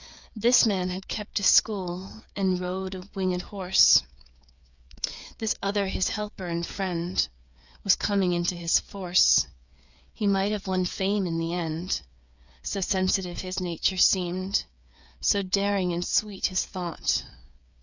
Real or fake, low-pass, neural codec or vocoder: fake; 7.2 kHz; codec, 16 kHz, 8 kbps, FreqCodec, smaller model